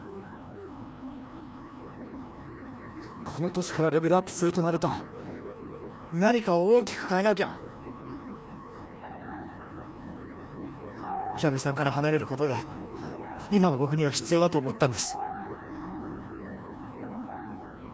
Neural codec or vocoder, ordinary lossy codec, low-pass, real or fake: codec, 16 kHz, 1 kbps, FreqCodec, larger model; none; none; fake